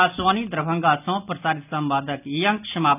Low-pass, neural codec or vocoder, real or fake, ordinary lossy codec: 3.6 kHz; none; real; none